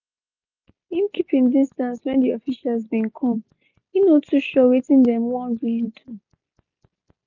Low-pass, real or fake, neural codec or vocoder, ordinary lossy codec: 7.2 kHz; real; none; none